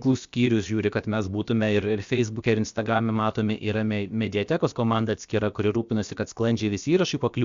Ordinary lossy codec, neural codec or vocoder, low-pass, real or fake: Opus, 64 kbps; codec, 16 kHz, about 1 kbps, DyCAST, with the encoder's durations; 7.2 kHz; fake